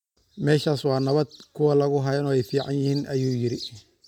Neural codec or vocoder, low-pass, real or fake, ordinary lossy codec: none; 19.8 kHz; real; none